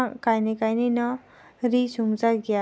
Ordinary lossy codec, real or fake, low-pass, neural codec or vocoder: none; real; none; none